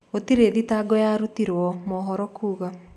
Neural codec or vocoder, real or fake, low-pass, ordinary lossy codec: none; real; 14.4 kHz; none